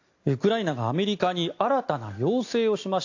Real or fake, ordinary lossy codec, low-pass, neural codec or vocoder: real; AAC, 48 kbps; 7.2 kHz; none